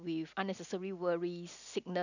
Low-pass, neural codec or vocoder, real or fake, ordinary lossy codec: 7.2 kHz; none; real; none